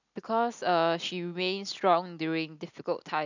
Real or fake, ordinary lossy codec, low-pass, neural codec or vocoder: real; none; 7.2 kHz; none